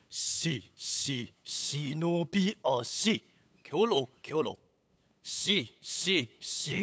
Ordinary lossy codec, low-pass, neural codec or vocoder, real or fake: none; none; codec, 16 kHz, 8 kbps, FunCodec, trained on LibriTTS, 25 frames a second; fake